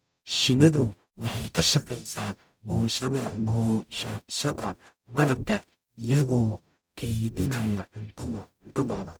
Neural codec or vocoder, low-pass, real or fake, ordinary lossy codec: codec, 44.1 kHz, 0.9 kbps, DAC; none; fake; none